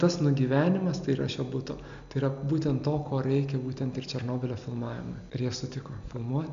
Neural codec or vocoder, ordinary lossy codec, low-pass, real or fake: none; MP3, 64 kbps; 7.2 kHz; real